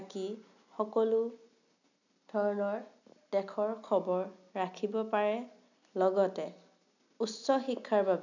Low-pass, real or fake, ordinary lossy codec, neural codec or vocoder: 7.2 kHz; real; none; none